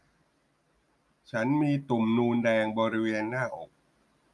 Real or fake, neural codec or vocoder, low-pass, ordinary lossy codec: real; none; none; none